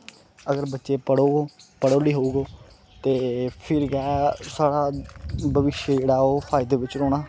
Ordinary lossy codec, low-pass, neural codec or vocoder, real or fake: none; none; none; real